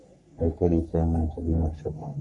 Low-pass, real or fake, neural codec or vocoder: 10.8 kHz; fake; codec, 44.1 kHz, 3.4 kbps, Pupu-Codec